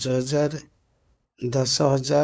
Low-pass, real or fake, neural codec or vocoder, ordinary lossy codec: none; fake; codec, 16 kHz, 2 kbps, FunCodec, trained on LibriTTS, 25 frames a second; none